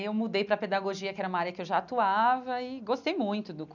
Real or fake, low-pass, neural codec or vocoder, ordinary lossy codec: real; 7.2 kHz; none; none